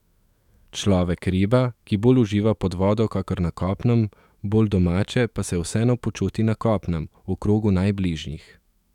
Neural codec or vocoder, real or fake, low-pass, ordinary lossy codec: autoencoder, 48 kHz, 128 numbers a frame, DAC-VAE, trained on Japanese speech; fake; 19.8 kHz; none